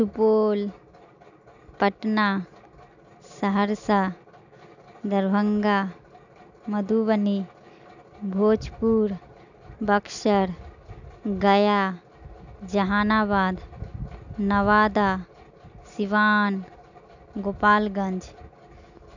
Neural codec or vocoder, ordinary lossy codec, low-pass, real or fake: none; none; 7.2 kHz; real